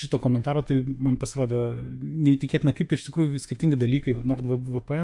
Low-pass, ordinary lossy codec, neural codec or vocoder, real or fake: 10.8 kHz; AAC, 64 kbps; autoencoder, 48 kHz, 32 numbers a frame, DAC-VAE, trained on Japanese speech; fake